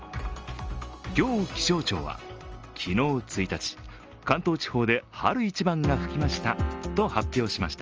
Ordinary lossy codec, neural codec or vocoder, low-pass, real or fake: Opus, 24 kbps; none; 7.2 kHz; real